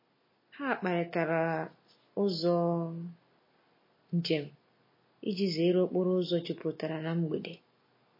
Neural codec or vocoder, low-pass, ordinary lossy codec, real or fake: none; 5.4 kHz; MP3, 24 kbps; real